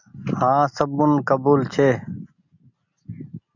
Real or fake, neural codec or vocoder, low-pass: real; none; 7.2 kHz